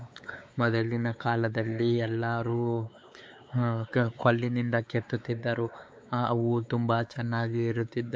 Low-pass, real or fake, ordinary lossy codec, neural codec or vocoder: none; fake; none; codec, 16 kHz, 4 kbps, X-Codec, WavLM features, trained on Multilingual LibriSpeech